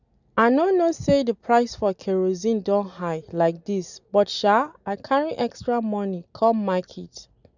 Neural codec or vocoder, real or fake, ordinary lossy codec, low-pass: none; real; none; 7.2 kHz